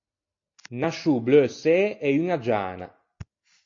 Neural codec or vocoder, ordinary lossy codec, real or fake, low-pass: none; AAC, 48 kbps; real; 7.2 kHz